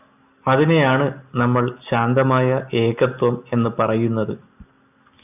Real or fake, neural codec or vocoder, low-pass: real; none; 3.6 kHz